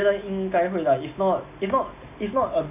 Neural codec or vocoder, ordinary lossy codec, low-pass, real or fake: none; none; 3.6 kHz; real